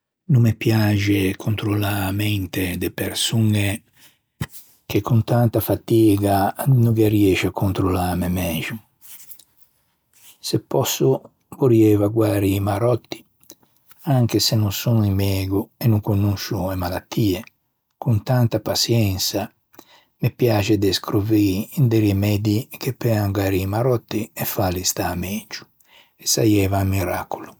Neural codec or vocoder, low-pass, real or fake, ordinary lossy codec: none; none; real; none